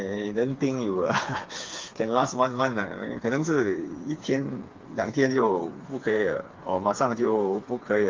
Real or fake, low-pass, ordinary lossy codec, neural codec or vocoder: fake; 7.2 kHz; Opus, 32 kbps; codec, 16 kHz, 4 kbps, FreqCodec, smaller model